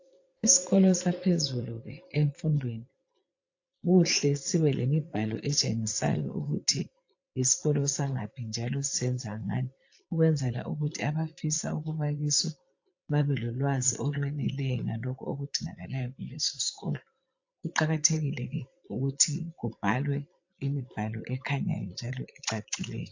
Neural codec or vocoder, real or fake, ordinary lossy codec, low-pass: vocoder, 22.05 kHz, 80 mel bands, Vocos; fake; AAC, 48 kbps; 7.2 kHz